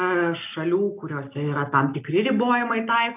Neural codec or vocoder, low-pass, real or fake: none; 3.6 kHz; real